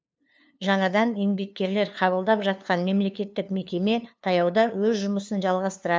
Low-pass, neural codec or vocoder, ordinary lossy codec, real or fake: none; codec, 16 kHz, 2 kbps, FunCodec, trained on LibriTTS, 25 frames a second; none; fake